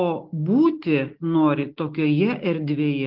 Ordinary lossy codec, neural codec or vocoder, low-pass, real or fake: Opus, 16 kbps; none; 5.4 kHz; real